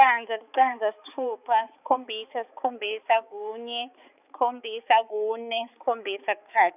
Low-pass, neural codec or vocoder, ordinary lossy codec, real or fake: 3.6 kHz; codec, 16 kHz, 4 kbps, X-Codec, HuBERT features, trained on balanced general audio; none; fake